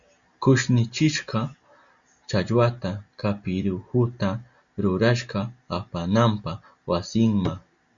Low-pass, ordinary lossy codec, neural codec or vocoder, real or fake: 7.2 kHz; Opus, 64 kbps; none; real